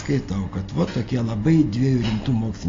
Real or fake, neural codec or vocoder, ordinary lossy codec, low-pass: real; none; AAC, 32 kbps; 7.2 kHz